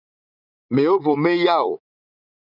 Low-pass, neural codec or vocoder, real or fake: 5.4 kHz; vocoder, 44.1 kHz, 80 mel bands, Vocos; fake